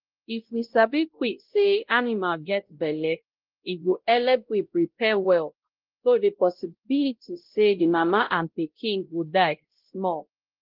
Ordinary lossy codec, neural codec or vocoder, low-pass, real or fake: Opus, 16 kbps; codec, 16 kHz, 0.5 kbps, X-Codec, WavLM features, trained on Multilingual LibriSpeech; 5.4 kHz; fake